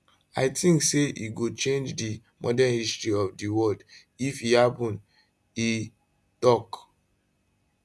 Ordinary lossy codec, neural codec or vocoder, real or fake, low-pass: none; none; real; none